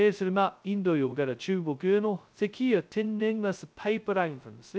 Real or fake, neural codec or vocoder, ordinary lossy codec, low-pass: fake; codec, 16 kHz, 0.2 kbps, FocalCodec; none; none